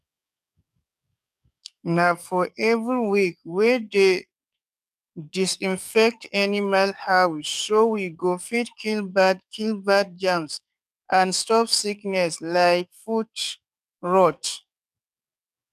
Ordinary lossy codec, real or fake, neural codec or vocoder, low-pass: none; fake; codec, 44.1 kHz, 7.8 kbps, DAC; 14.4 kHz